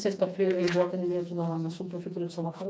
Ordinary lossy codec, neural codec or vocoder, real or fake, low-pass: none; codec, 16 kHz, 2 kbps, FreqCodec, smaller model; fake; none